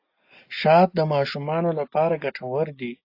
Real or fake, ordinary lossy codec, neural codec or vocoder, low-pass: real; AAC, 32 kbps; none; 5.4 kHz